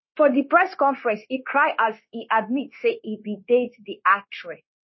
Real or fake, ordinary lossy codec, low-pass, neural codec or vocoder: fake; MP3, 24 kbps; 7.2 kHz; codec, 16 kHz, 0.9 kbps, LongCat-Audio-Codec